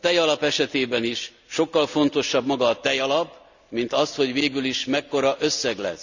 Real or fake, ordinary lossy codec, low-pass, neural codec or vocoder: real; none; 7.2 kHz; none